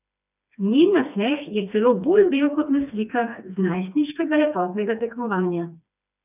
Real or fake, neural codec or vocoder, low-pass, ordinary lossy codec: fake; codec, 16 kHz, 2 kbps, FreqCodec, smaller model; 3.6 kHz; none